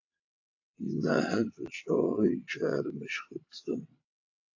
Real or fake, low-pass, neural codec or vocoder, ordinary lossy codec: fake; 7.2 kHz; vocoder, 22.05 kHz, 80 mel bands, WaveNeXt; AAC, 48 kbps